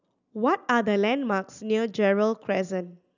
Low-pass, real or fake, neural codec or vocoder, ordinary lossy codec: 7.2 kHz; real; none; none